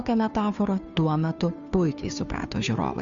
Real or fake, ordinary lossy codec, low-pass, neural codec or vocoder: fake; Opus, 64 kbps; 7.2 kHz; codec, 16 kHz, 2 kbps, FunCodec, trained on Chinese and English, 25 frames a second